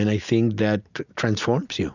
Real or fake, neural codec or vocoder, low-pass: real; none; 7.2 kHz